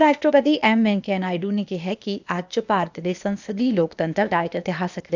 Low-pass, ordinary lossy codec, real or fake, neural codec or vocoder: 7.2 kHz; none; fake; codec, 16 kHz, 0.8 kbps, ZipCodec